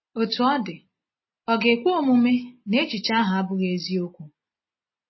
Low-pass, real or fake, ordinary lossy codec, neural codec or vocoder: 7.2 kHz; real; MP3, 24 kbps; none